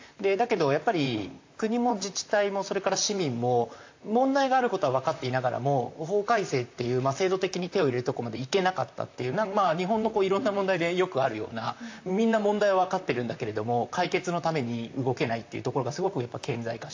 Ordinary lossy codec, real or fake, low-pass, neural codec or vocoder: AAC, 48 kbps; fake; 7.2 kHz; vocoder, 44.1 kHz, 128 mel bands, Pupu-Vocoder